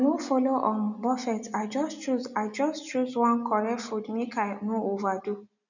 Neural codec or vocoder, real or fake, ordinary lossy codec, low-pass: none; real; none; 7.2 kHz